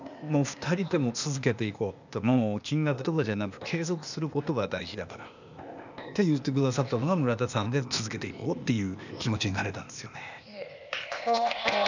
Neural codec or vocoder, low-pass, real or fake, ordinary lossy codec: codec, 16 kHz, 0.8 kbps, ZipCodec; 7.2 kHz; fake; none